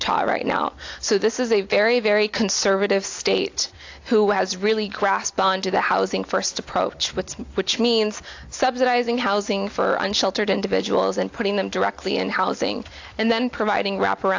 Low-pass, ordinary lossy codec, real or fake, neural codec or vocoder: 7.2 kHz; AAC, 48 kbps; real; none